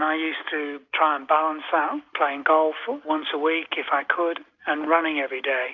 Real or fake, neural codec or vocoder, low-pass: real; none; 7.2 kHz